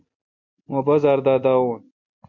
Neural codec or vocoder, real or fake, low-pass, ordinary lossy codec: none; real; 7.2 kHz; MP3, 48 kbps